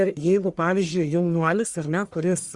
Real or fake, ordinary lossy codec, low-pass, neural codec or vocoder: fake; Opus, 64 kbps; 10.8 kHz; codec, 44.1 kHz, 1.7 kbps, Pupu-Codec